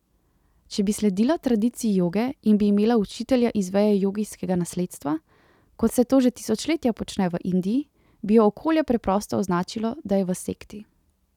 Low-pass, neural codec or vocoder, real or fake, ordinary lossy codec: 19.8 kHz; none; real; none